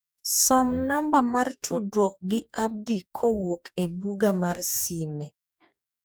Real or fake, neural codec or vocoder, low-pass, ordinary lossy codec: fake; codec, 44.1 kHz, 2.6 kbps, DAC; none; none